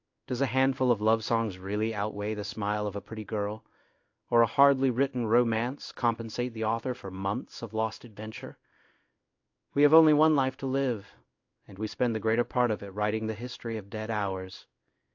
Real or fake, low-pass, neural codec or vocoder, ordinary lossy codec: fake; 7.2 kHz; codec, 16 kHz in and 24 kHz out, 1 kbps, XY-Tokenizer; AAC, 48 kbps